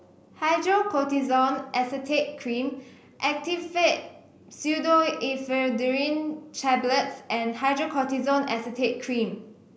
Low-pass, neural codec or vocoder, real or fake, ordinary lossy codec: none; none; real; none